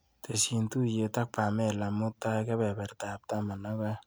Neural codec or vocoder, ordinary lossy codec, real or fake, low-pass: none; none; real; none